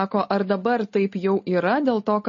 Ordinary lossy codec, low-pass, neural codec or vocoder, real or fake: MP3, 32 kbps; 7.2 kHz; none; real